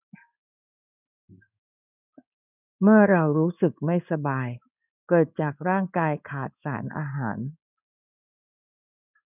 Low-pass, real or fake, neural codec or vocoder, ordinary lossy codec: 3.6 kHz; fake; codec, 16 kHz in and 24 kHz out, 1 kbps, XY-Tokenizer; none